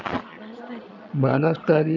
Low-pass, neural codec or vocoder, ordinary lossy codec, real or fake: 7.2 kHz; vocoder, 22.05 kHz, 80 mel bands, Vocos; none; fake